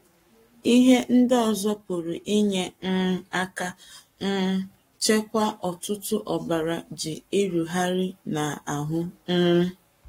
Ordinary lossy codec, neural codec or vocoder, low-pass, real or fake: AAC, 48 kbps; codec, 44.1 kHz, 7.8 kbps, Pupu-Codec; 19.8 kHz; fake